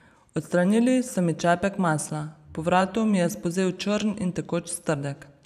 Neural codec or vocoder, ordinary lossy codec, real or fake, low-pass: vocoder, 44.1 kHz, 128 mel bands every 512 samples, BigVGAN v2; none; fake; 14.4 kHz